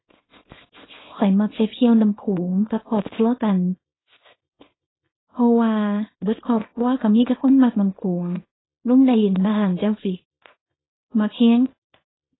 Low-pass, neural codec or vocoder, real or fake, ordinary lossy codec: 7.2 kHz; codec, 24 kHz, 0.9 kbps, WavTokenizer, small release; fake; AAC, 16 kbps